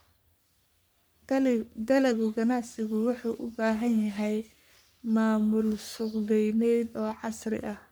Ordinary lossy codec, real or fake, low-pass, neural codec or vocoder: none; fake; none; codec, 44.1 kHz, 3.4 kbps, Pupu-Codec